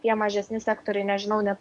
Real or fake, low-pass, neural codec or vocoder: fake; 10.8 kHz; codec, 44.1 kHz, 7.8 kbps, DAC